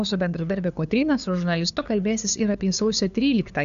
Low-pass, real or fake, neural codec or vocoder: 7.2 kHz; fake; codec, 16 kHz, 2 kbps, FunCodec, trained on LibriTTS, 25 frames a second